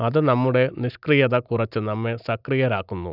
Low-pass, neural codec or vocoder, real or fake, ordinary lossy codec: 5.4 kHz; none; real; none